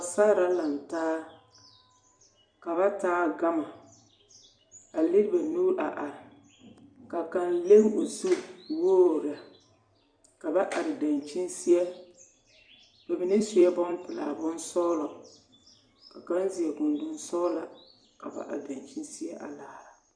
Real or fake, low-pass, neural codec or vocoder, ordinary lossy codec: fake; 9.9 kHz; vocoder, 44.1 kHz, 128 mel bands every 256 samples, BigVGAN v2; Opus, 64 kbps